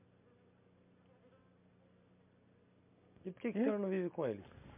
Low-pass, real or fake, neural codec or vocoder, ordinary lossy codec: 3.6 kHz; real; none; MP3, 32 kbps